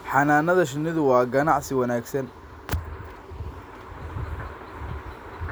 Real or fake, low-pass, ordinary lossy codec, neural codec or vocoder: real; none; none; none